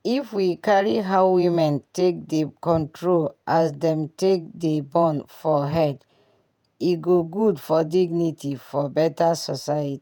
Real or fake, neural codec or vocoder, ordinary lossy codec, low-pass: fake; vocoder, 48 kHz, 128 mel bands, Vocos; none; 19.8 kHz